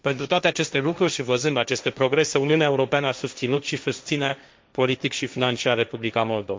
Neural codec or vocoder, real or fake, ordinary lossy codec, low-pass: codec, 16 kHz, 1.1 kbps, Voila-Tokenizer; fake; none; none